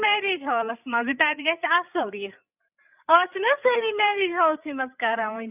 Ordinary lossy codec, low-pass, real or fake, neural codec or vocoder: none; 3.6 kHz; fake; codec, 16 kHz, 8 kbps, FreqCodec, larger model